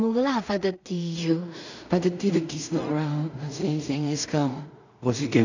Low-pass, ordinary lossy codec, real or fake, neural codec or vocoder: 7.2 kHz; none; fake; codec, 16 kHz in and 24 kHz out, 0.4 kbps, LongCat-Audio-Codec, two codebook decoder